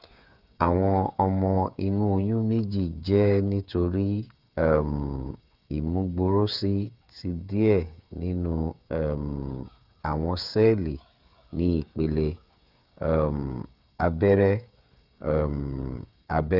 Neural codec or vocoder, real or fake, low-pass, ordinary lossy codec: codec, 16 kHz, 8 kbps, FreqCodec, smaller model; fake; 5.4 kHz; none